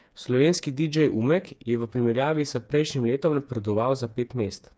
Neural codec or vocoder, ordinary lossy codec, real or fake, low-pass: codec, 16 kHz, 4 kbps, FreqCodec, smaller model; none; fake; none